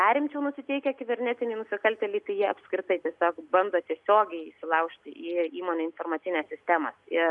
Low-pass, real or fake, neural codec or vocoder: 10.8 kHz; real; none